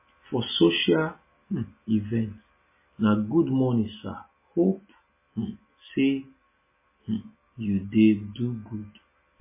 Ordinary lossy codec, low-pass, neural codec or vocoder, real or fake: MP3, 16 kbps; 3.6 kHz; none; real